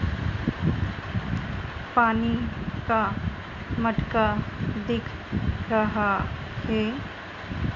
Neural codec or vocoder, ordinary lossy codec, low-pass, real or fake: none; MP3, 64 kbps; 7.2 kHz; real